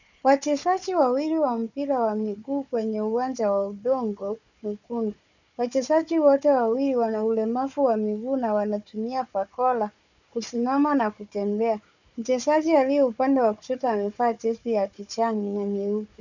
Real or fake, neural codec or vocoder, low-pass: fake; codec, 16 kHz, 4 kbps, FunCodec, trained on Chinese and English, 50 frames a second; 7.2 kHz